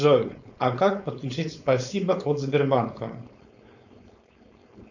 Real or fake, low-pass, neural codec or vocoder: fake; 7.2 kHz; codec, 16 kHz, 4.8 kbps, FACodec